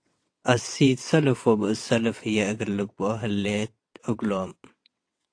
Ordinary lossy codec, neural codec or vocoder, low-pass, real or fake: AAC, 48 kbps; vocoder, 22.05 kHz, 80 mel bands, WaveNeXt; 9.9 kHz; fake